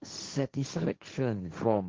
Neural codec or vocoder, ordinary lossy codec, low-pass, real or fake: codec, 16 kHz, 1.1 kbps, Voila-Tokenizer; Opus, 16 kbps; 7.2 kHz; fake